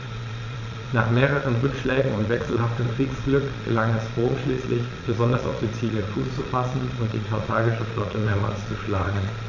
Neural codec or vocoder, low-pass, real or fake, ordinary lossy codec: vocoder, 22.05 kHz, 80 mel bands, Vocos; 7.2 kHz; fake; none